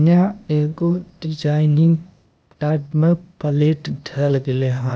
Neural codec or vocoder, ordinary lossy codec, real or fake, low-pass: codec, 16 kHz, 0.8 kbps, ZipCodec; none; fake; none